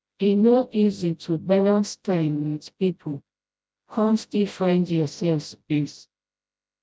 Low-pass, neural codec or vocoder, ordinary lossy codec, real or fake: none; codec, 16 kHz, 0.5 kbps, FreqCodec, smaller model; none; fake